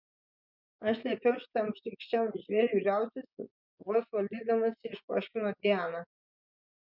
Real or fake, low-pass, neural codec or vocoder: fake; 5.4 kHz; vocoder, 24 kHz, 100 mel bands, Vocos